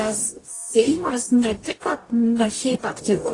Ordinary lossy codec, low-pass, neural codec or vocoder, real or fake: AAC, 32 kbps; 10.8 kHz; codec, 44.1 kHz, 0.9 kbps, DAC; fake